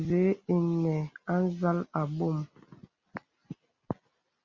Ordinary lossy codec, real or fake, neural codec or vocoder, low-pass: Opus, 64 kbps; real; none; 7.2 kHz